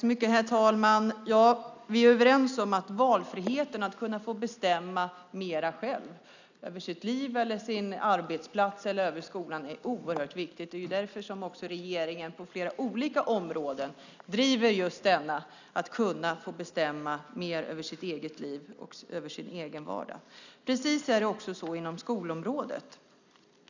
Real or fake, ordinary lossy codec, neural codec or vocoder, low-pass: real; none; none; 7.2 kHz